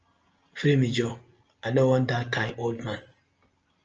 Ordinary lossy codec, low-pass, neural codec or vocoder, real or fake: Opus, 24 kbps; 7.2 kHz; none; real